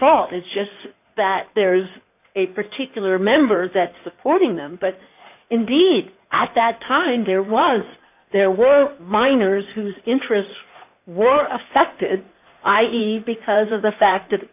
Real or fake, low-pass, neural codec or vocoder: fake; 3.6 kHz; codec, 16 kHz in and 24 kHz out, 2.2 kbps, FireRedTTS-2 codec